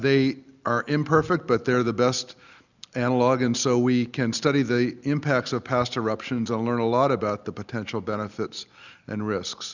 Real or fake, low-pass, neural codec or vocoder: real; 7.2 kHz; none